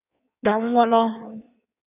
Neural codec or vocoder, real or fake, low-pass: codec, 16 kHz in and 24 kHz out, 1.1 kbps, FireRedTTS-2 codec; fake; 3.6 kHz